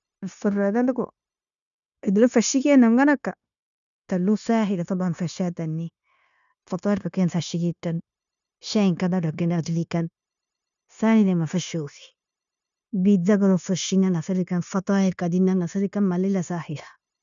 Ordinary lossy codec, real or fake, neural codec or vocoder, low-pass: none; fake; codec, 16 kHz, 0.9 kbps, LongCat-Audio-Codec; 7.2 kHz